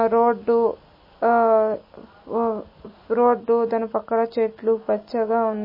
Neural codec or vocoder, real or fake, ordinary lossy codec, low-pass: none; real; MP3, 24 kbps; 5.4 kHz